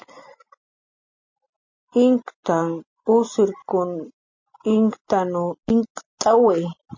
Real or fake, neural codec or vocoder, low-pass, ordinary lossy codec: real; none; 7.2 kHz; MP3, 32 kbps